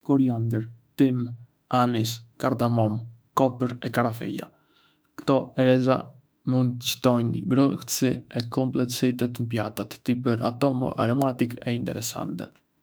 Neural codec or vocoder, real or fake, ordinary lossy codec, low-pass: autoencoder, 48 kHz, 32 numbers a frame, DAC-VAE, trained on Japanese speech; fake; none; none